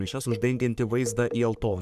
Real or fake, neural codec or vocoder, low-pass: fake; codec, 44.1 kHz, 3.4 kbps, Pupu-Codec; 14.4 kHz